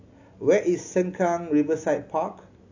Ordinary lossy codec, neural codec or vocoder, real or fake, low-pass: AAC, 48 kbps; none; real; 7.2 kHz